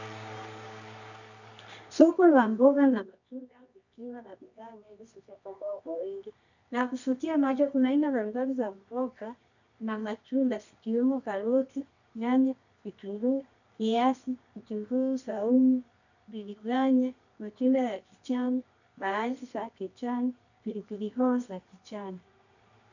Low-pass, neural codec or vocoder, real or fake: 7.2 kHz; codec, 24 kHz, 0.9 kbps, WavTokenizer, medium music audio release; fake